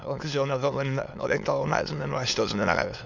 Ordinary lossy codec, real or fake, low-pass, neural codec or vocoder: none; fake; 7.2 kHz; autoencoder, 22.05 kHz, a latent of 192 numbers a frame, VITS, trained on many speakers